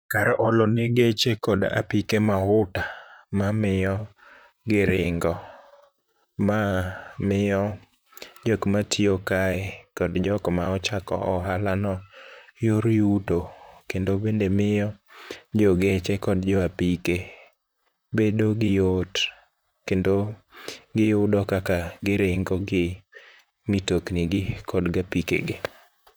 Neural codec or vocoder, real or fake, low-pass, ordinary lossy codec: vocoder, 44.1 kHz, 128 mel bands, Pupu-Vocoder; fake; none; none